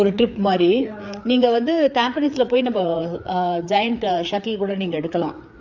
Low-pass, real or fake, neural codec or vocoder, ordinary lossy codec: 7.2 kHz; fake; codec, 16 kHz, 4 kbps, FreqCodec, larger model; none